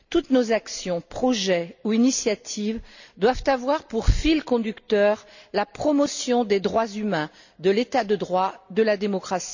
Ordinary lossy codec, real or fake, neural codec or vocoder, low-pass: none; real; none; 7.2 kHz